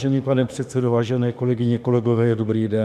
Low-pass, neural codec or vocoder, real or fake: 14.4 kHz; autoencoder, 48 kHz, 32 numbers a frame, DAC-VAE, trained on Japanese speech; fake